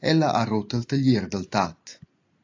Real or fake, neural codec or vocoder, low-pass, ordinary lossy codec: real; none; 7.2 kHz; AAC, 48 kbps